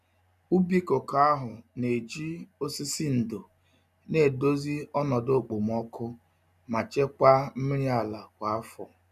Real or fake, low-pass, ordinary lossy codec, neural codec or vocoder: real; 14.4 kHz; none; none